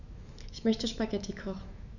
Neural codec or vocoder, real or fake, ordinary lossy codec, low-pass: autoencoder, 48 kHz, 128 numbers a frame, DAC-VAE, trained on Japanese speech; fake; none; 7.2 kHz